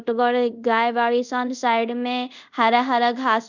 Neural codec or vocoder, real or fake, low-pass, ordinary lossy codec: codec, 24 kHz, 0.5 kbps, DualCodec; fake; 7.2 kHz; none